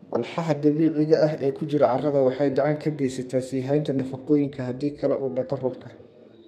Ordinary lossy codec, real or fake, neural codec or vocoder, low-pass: none; fake; codec, 32 kHz, 1.9 kbps, SNAC; 14.4 kHz